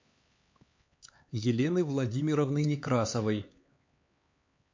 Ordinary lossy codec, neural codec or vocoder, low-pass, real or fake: MP3, 48 kbps; codec, 16 kHz, 4 kbps, X-Codec, HuBERT features, trained on LibriSpeech; 7.2 kHz; fake